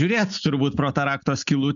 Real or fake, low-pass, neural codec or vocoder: real; 7.2 kHz; none